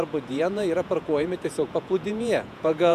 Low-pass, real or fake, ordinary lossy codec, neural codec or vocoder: 14.4 kHz; fake; MP3, 96 kbps; vocoder, 48 kHz, 128 mel bands, Vocos